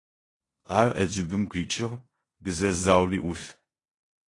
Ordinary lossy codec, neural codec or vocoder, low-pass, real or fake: AAC, 32 kbps; codec, 16 kHz in and 24 kHz out, 0.9 kbps, LongCat-Audio-Codec, four codebook decoder; 10.8 kHz; fake